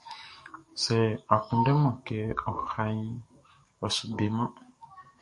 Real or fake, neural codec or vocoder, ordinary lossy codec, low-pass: fake; codec, 44.1 kHz, 7.8 kbps, DAC; MP3, 48 kbps; 10.8 kHz